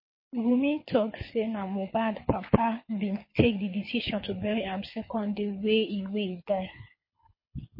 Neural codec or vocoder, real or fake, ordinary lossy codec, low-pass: codec, 24 kHz, 6 kbps, HILCodec; fake; MP3, 24 kbps; 5.4 kHz